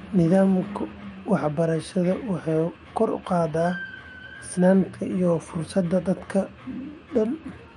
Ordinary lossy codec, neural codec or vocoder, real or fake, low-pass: MP3, 48 kbps; autoencoder, 48 kHz, 128 numbers a frame, DAC-VAE, trained on Japanese speech; fake; 19.8 kHz